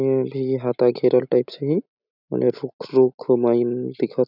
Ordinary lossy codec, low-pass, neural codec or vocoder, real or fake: none; 5.4 kHz; none; real